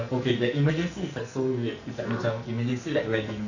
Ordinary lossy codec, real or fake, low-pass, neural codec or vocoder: none; fake; 7.2 kHz; codec, 44.1 kHz, 2.6 kbps, SNAC